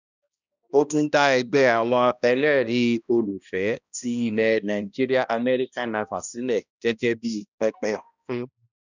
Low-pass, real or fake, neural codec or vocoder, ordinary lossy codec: 7.2 kHz; fake; codec, 16 kHz, 1 kbps, X-Codec, HuBERT features, trained on balanced general audio; none